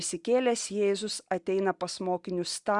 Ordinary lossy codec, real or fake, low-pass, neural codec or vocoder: Opus, 64 kbps; real; 10.8 kHz; none